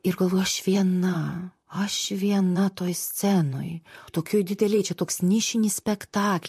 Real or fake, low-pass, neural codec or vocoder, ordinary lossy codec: fake; 14.4 kHz; vocoder, 44.1 kHz, 128 mel bands, Pupu-Vocoder; MP3, 64 kbps